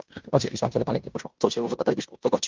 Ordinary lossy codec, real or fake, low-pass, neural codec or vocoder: Opus, 32 kbps; fake; 7.2 kHz; codec, 16 kHz in and 24 kHz out, 0.9 kbps, LongCat-Audio-Codec, fine tuned four codebook decoder